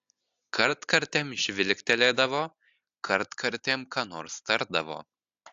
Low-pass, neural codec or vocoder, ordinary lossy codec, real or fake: 7.2 kHz; none; AAC, 64 kbps; real